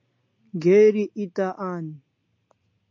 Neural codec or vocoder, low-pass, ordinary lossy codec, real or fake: none; 7.2 kHz; MP3, 48 kbps; real